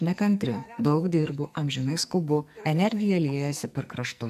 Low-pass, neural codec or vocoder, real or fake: 14.4 kHz; codec, 44.1 kHz, 2.6 kbps, SNAC; fake